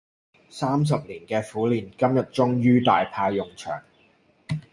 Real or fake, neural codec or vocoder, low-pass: real; none; 10.8 kHz